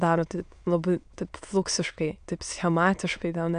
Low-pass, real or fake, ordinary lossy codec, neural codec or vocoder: 9.9 kHz; fake; MP3, 96 kbps; autoencoder, 22.05 kHz, a latent of 192 numbers a frame, VITS, trained on many speakers